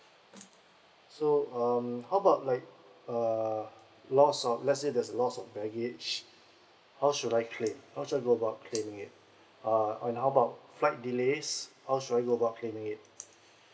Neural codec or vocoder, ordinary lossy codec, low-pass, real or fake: none; none; none; real